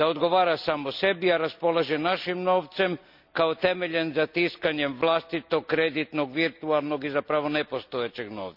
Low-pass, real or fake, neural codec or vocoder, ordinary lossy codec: 5.4 kHz; real; none; none